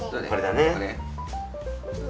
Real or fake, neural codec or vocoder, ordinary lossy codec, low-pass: real; none; none; none